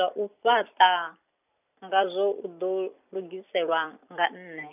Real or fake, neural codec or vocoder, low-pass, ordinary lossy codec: fake; vocoder, 44.1 kHz, 128 mel bands every 256 samples, BigVGAN v2; 3.6 kHz; none